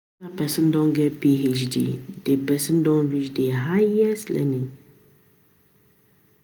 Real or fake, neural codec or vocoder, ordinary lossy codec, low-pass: real; none; none; none